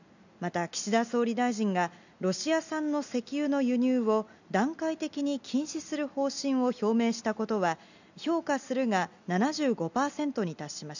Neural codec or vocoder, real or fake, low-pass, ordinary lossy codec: none; real; 7.2 kHz; none